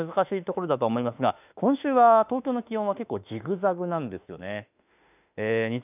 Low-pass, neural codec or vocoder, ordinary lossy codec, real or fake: 3.6 kHz; autoencoder, 48 kHz, 32 numbers a frame, DAC-VAE, trained on Japanese speech; none; fake